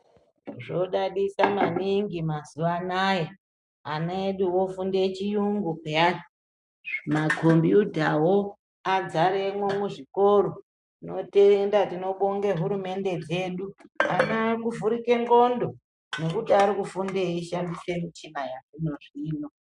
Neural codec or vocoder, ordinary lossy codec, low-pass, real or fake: none; MP3, 96 kbps; 10.8 kHz; real